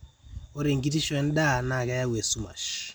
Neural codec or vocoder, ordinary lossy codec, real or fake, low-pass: none; none; real; none